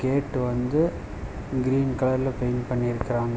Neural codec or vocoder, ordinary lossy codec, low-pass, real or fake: none; none; none; real